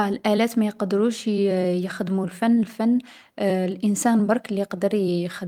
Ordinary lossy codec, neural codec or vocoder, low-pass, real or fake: Opus, 32 kbps; vocoder, 44.1 kHz, 128 mel bands every 256 samples, BigVGAN v2; 19.8 kHz; fake